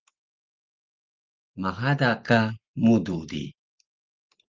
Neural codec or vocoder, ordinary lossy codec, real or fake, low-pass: none; Opus, 32 kbps; real; 7.2 kHz